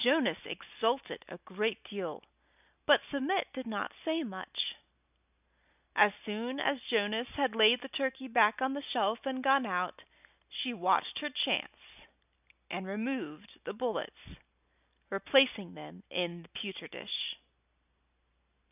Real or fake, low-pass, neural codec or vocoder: real; 3.6 kHz; none